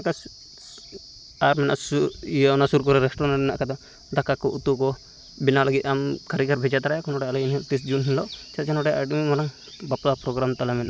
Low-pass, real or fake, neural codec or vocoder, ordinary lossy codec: none; real; none; none